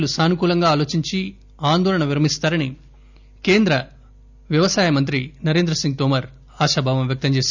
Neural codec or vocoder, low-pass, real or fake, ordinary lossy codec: none; 7.2 kHz; real; none